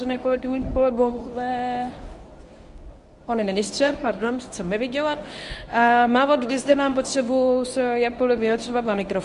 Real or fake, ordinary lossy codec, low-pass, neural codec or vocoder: fake; Opus, 64 kbps; 10.8 kHz; codec, 24 kHz, 0.9 kbps, WavTokenizer, medium speech release version 1